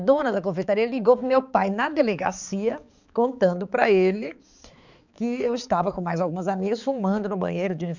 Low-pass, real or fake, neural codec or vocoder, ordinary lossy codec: 7.2 kHz; fake; codec, 16 kHz, 4 kbps, X-Codec, HuBERT features, trained on balanced general audio; none